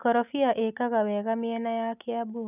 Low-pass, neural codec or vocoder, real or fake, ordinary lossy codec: 3.6 kHz; none; real; none